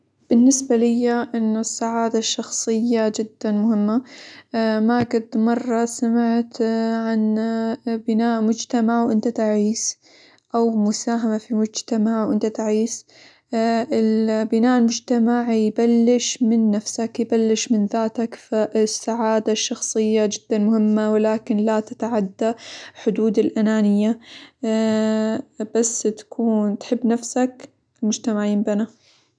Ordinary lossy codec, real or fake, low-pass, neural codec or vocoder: none; real; 9.9 kHz; none